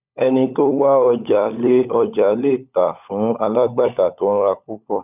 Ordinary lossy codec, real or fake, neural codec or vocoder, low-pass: none; fake; codec, 16 kHz, 16 kbps, FunCodec, trained on LibriTTS, 50 frames a second; 3.6 kHz